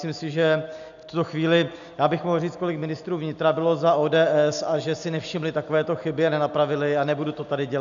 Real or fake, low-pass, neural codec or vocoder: real; 7.2 kHz; none